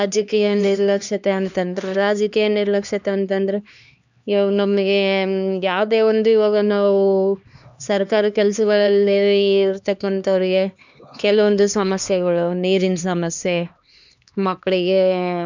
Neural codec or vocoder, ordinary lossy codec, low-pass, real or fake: codec, 16 kHz, 2 kbps, X-Codec, HuBERT features, trained on LibriSpeech; none; 7.2 kHz; fake